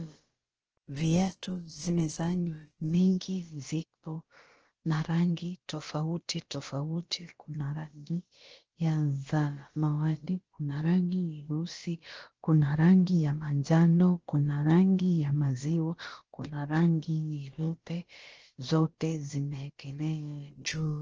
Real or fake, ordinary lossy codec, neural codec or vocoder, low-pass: fake; Opus, 16 kbps; codec, 16 kHz, about 1 kbps, DyCAST, with the encoder's durations; 7.2 kHz